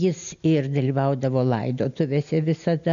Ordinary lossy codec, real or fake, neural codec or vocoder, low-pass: MP3, 96 kbps; real; none; 7.2 kHz